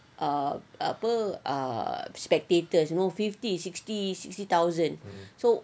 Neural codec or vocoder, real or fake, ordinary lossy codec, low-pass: none; real; none; none